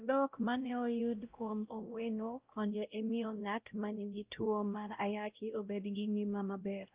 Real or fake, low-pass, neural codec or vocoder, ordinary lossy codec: fake; 3.6 kHz; codec, 16 kHz, 0.5 kbps, X-Codec, WavLM features, trained on Multilingual LibriSpeech; Opus, 24 kbps